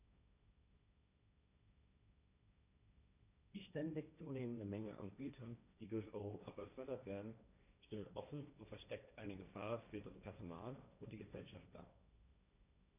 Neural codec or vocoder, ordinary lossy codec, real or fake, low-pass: codec, 16 kHz, 1.1 kbps, Voila-Tokenizer; none; fake; 3.6 kHz